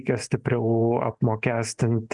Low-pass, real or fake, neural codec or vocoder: 10.8 kHz; real; none